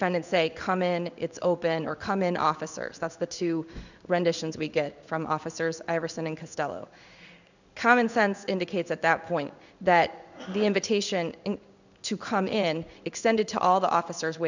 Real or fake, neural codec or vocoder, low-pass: fake; codec, 16 kHz in and 24 kHz out, 1 kbps, XY-Tokenizer; 7.2 kHz